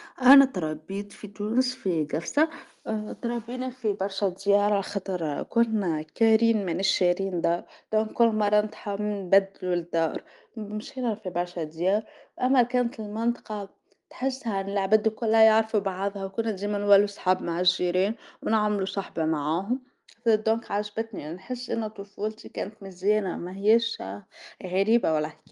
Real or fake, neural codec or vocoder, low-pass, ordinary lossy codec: real; none; 10.8 kHz; Opus, 32 kbps